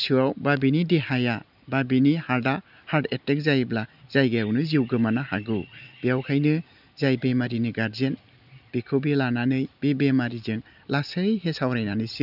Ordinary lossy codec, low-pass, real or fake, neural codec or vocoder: none; 5.4 kHz; real; none